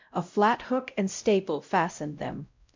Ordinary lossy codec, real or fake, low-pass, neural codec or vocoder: MP3, 64 kbps; fake; 7.2 kHz; codec, 16 kHz, 0.5 kbps, X-Codec, WavLM features, trained on Multilingual LibriSpeech